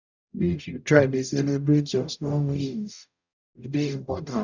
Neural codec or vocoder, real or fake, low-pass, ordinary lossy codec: codec, 44.1 kHz, 0.9 kbps, DAC; fake; 7.2 kHz; none